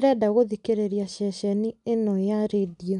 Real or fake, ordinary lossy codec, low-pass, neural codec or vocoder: real; none; 10.8 kHz; none